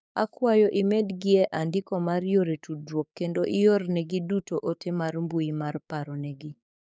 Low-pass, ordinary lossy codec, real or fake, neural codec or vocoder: none; none; fake; codec, 16 kHz, 6 kbps, DAC